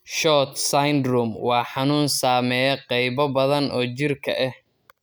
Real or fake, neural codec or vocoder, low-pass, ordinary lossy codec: real; none; none; none